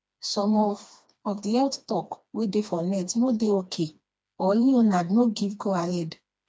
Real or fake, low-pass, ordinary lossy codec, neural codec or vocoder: fake; none; none; codec, 16 kHz, 2 kbps, FreqCodec, smaller model